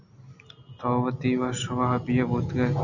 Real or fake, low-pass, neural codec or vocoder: real; 7.2 kHz; none